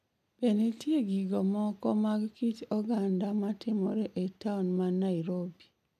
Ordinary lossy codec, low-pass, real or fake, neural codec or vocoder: none; 14.4 kHz; real; none